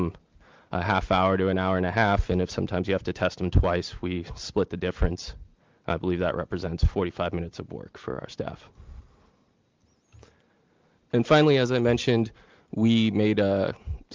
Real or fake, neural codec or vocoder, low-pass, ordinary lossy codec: real; none; 7.2 kHz; Opus, 32 kbps